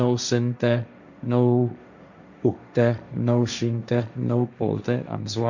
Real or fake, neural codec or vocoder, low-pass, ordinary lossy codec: fake; codec, 16 kHz, 1.1 kbps, Voila-Tokenizer; 7.2 kHz; none